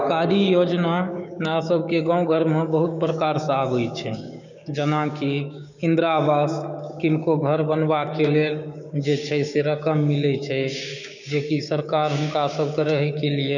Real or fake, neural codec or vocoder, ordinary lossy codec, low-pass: fake; codec, 16 kHz, 6 kbps, DAC; none; 7.2 kHz